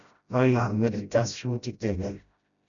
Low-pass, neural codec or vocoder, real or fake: 7.2 kHz; codec, 16 kHz, 0.5 kbps, FreqCodec, smaller model; fake